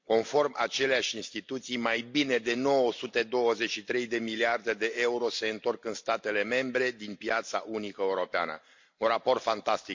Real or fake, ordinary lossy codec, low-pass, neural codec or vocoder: real; MP3, 48 kbps; 7.2 kHz; none